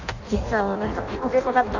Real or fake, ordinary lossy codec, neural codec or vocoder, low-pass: fake; none; codec, 16 kHz in and 24 kHz out, 0.6 kbps, FireRedTTS-2 codec; 7.2 kHz